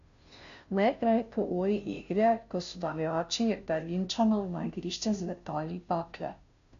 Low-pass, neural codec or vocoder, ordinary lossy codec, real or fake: 7.2 kHz; codec, 16 kHz, 0.5 kbps, FunCodec, trained on Chinese and English, 25 frames a second; none; fake